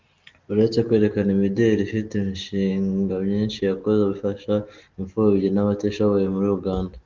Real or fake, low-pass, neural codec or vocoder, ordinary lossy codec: real; 7.2 kHz; none; Opus, 32 kbps